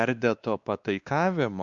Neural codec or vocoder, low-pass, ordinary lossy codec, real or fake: codec, 16 kHz, 2 kbps, X-Codec, WavLM features, trained on Multilingual LibriSpeech; 7.2 kHz; Opus, 64 kbps; fake